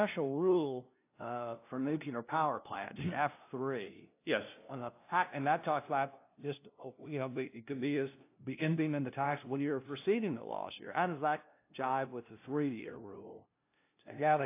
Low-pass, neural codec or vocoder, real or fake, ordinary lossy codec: 3.6 kHz; codec, 16 kHz, 0.5 kbps, FunCodec, trained on LibriTTS, 25 frames a second; fake; AAC, 24 kbps